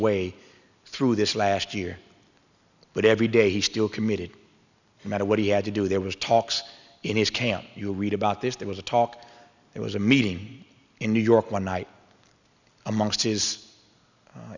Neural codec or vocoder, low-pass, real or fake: none; 7.2 kHz; real